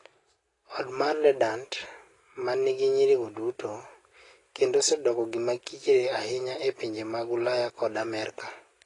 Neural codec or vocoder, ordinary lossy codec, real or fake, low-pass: none; AAC, 32 kbps; real; 10.8 kHz